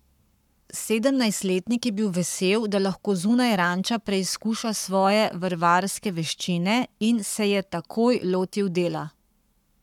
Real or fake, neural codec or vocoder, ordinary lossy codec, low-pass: fake; codec, 44.1 kHz, 7.8 kbps, Pupu-Codec; none; 19.8 kHz